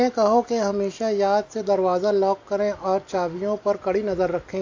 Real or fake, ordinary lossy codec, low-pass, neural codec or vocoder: real; AAC, 48 kbps; 7.2 kHz; none